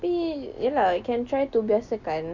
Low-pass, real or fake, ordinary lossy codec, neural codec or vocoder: 7.2 kHz; real; none; none